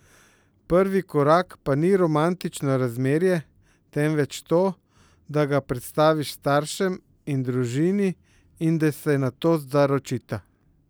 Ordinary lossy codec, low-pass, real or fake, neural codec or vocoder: none; none; real; none